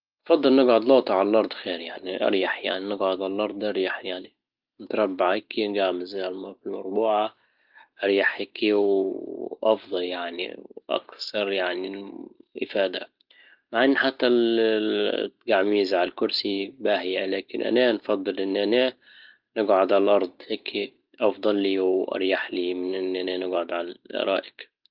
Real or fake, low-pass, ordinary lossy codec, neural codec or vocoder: real; 5.4 kHz; Opus, 32 kbps; none